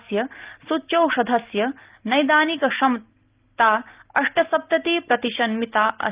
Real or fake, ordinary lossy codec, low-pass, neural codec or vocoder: real; Opus, 32 kbps; 3.6 kHz; none